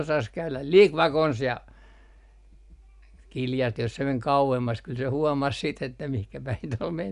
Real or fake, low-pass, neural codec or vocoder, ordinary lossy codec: real; 10.8 kHz; none; none